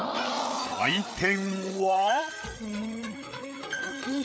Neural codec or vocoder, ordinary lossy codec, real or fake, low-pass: codec, 16 kHz, 8 kbps, FreqCodec, larger model; none; fake; none